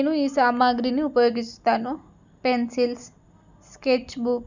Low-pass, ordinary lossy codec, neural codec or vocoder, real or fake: 7.2 kHz; none; none; real